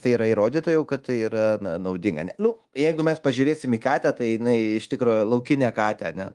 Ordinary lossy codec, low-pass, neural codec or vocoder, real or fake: Opus, 24 kbps; 10.8 kHz; codec, 24 kHz, 1.2 kbps, DualCodec; fake